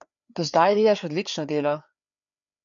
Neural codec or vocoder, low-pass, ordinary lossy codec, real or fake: codec, 16 kHz, 4 kbps, FreqCodec, larger model; 7.2 kHz; MP3, 96 kbps; fake